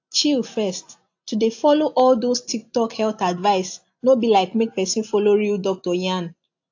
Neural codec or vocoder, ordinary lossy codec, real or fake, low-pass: none; AAC, 48 kbps; real; 7.2 kHz